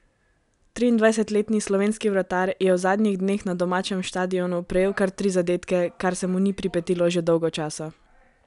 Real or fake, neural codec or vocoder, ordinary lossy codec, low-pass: real; none; none; 10.8 kHz